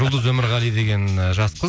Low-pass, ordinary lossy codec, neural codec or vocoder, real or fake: none; none; none; real